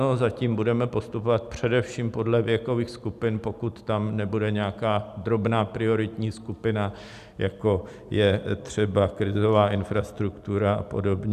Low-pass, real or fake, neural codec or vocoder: 14.4 kHz; real; none